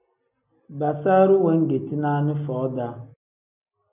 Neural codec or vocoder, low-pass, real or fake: none; 3.6 kHz; real